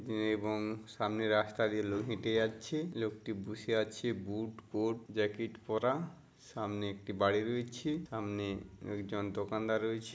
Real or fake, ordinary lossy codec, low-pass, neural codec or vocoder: real; none; none; none